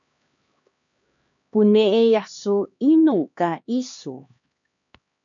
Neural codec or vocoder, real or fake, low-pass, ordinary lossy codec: codec, 16 kHz, 2 kbps, X-Codec, HuBERT features, trained on LibriSpeech; fake; 7.2 kHz; MP3, 96 kbps